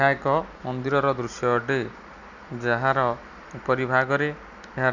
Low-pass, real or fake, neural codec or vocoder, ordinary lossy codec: 7.2 kHz; real; none; none